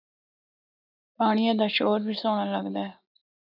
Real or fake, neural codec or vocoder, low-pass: real; none; 5.4 kHz